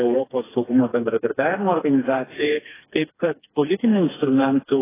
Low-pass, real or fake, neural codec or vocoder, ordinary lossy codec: 3.6 kHz; fake; codec, 16 kHz, 2 kbps, FreqCodec, smaller model; AAC, 16 kbps